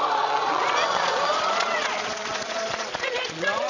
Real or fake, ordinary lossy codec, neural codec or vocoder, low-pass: fake; none; vocoder, 22.05 kHz, 80 mel bands, Vocos; 7.2 kHz